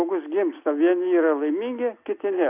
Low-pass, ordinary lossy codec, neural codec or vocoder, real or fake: 3.6 kHz; AAC, 32 kbps; none; real